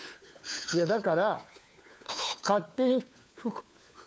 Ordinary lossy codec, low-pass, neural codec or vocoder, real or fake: none; none; codec, 16 kHz, 4 kbps, FunCodec, trained on LibriTTS, 50 frames a second; fake